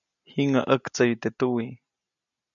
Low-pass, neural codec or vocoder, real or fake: 7.2 kHz; none; real